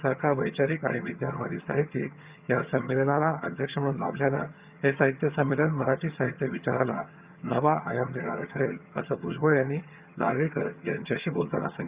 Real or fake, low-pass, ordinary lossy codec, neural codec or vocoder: fake; 3.6 kHz; Opus, 64 kbps; vocoder, 22.05 kHz, 80 mel bands, HiFi-GAN